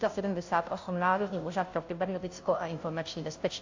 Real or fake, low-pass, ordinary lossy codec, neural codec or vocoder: fake; 7.2 kHz; AAC, 48 kbps; codec, 16 kHz, 0.5 kbps, FunCodec, trained on Chinese and English, 25 frames a second